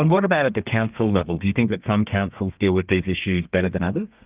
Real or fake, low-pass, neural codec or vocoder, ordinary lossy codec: fake; 3.6 kHz; codec, 32 kHz, 1.9 kbps, SNAC; Opus, 24 kbps